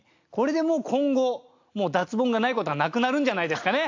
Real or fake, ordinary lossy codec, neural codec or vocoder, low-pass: real; AAC, 48 kbps; none; 7.2 kHz